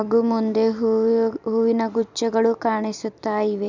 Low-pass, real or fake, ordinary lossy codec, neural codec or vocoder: 7.2 kHz; fake; none; vocoder, 44.1 kHz, 128 mel bands every 256 samples, BigVGAN v2